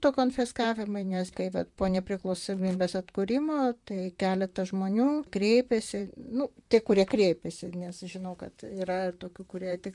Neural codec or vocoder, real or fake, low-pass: vocoder, 44.1 kHz, 128 mel bands, Pupu-Vocoder; fake; 10.8 kHz